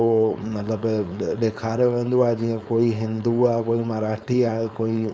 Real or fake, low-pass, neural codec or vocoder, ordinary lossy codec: fake; none; codec, 16 kHz, 4.8 kbps, FACodec; none